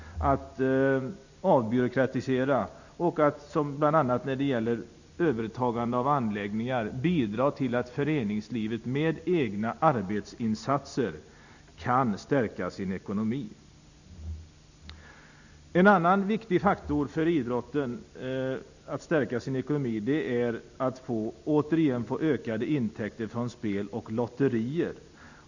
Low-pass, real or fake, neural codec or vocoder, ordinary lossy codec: 7.2 kHz; real; none; none